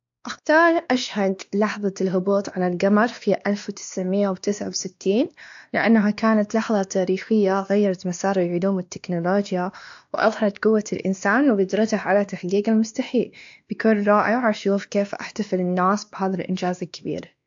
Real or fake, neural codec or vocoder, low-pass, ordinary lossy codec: fake; codec, 16 kHz, 2 kbps, X-Codec, WavLM features, trained on Multilingual LibriSpeech; 7.2 kHz; AAC, 64 kbps